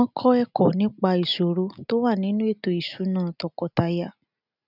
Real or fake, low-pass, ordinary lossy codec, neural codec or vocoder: real; 5.4 kHz; none; none